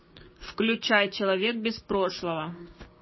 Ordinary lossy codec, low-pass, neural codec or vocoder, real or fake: MP3, 24 kbps; 7.2 kHz; codec, 16 kHz, 6 kbps, DAC; fake